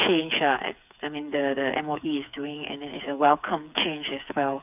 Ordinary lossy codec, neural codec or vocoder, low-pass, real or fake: none; codec, 16 kHz, 4 kbps, FreqCodec, smaller model; 3.6 kHz; fake